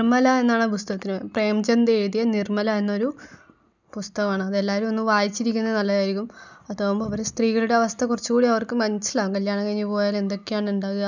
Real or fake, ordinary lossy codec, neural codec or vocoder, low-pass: real; none; none; 7.2 kHz